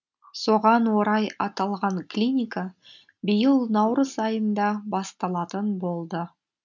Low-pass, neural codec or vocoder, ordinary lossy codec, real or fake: 7.2 kHz; none; none; real